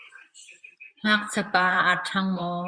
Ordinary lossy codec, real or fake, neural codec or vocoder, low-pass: Opus, 64 kbps; fake; vocoder, 44.1 kHz, 128 mel bands, Pupu-Vocoder; 9.9 kHz